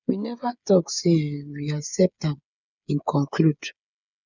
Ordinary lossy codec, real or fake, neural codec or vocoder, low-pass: none; fake; codec, 16 kHz, 8 kbps, FreqCodec, smaller model; 7.2 kHz